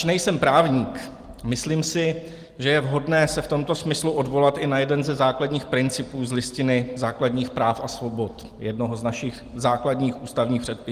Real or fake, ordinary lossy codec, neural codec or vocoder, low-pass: real; Opus, 24 kbps; none; 14.4 kHz